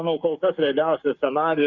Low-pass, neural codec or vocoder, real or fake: 7.2 kHz; codec, 44.1 kHz, 7.8 kbps, Pupu-Codec; fake